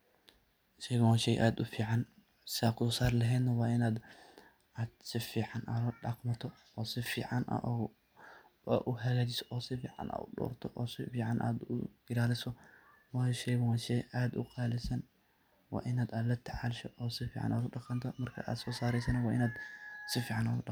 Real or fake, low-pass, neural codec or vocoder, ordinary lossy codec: real; none; none; none